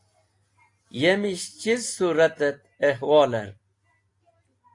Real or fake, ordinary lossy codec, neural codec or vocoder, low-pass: real; AAC, 48 kbps; none; 10.8 kHz